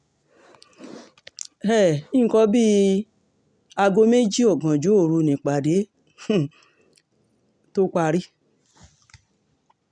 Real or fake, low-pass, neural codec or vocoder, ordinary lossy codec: real; 9.9 kHz; none; none